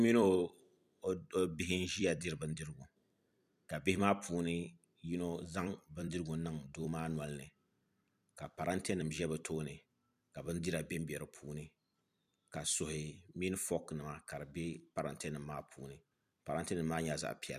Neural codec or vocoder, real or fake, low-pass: vocoder, 44.1 kHz, 128 mel bands every 256 samples, BigVGAN v2; fake; 14.4 kHz